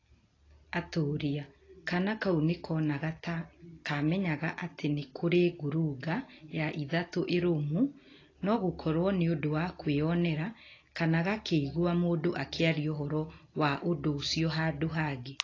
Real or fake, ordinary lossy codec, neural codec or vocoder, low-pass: real; AAC, 32 kbps; none; 7.2 kHz